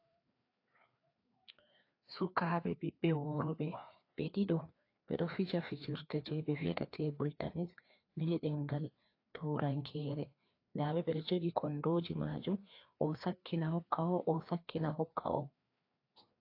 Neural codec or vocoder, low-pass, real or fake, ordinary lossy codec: codec, 16 kHz, 2 kbps, FreqCodec, larger model; 5.4 kHz; fake; AAC, 32 kbps